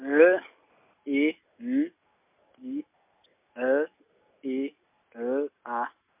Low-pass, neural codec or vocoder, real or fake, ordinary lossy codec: 3.6 kHz; none; real; MP3, 32 kbps